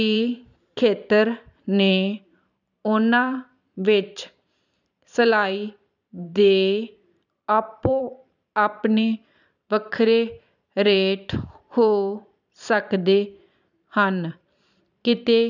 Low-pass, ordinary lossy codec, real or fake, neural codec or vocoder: 7.2 kHz; none; fake; vocoder, 44.1 kHz, 128 mel bands every 512 samples, BigVGAN v2